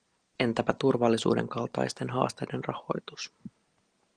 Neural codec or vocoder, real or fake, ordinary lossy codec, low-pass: none; real; Opus, 24 kbps; 9.9 kHz